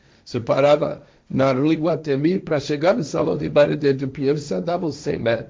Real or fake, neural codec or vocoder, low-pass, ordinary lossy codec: fake; codec, 16 kHz, 1.1 kbps, Voila-Tokenizer; none; none